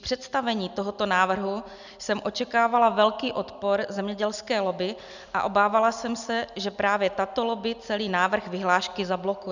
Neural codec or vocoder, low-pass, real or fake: none; 7.2 kHz; real